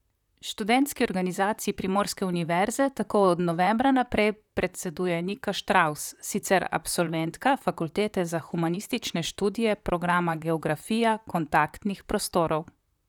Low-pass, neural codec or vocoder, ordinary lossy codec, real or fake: 19.8 kHz; vocoder, 44.1 kHz, 128 mel bands, Pupu-Vocoder; none; fake